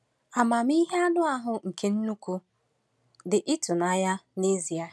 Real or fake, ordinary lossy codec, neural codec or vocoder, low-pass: real; none; none; none